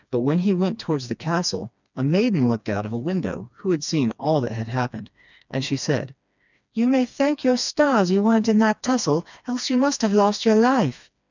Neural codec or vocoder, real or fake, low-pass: codec, 16 kHz, 2 kbps, FreqCodec, smaller model; fake; 7.2 kHz